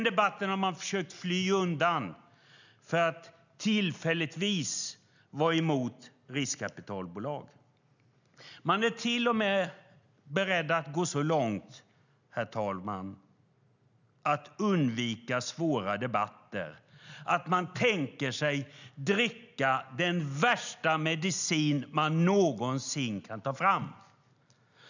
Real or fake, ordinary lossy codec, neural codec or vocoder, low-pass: real; none; none; 7.2 kHz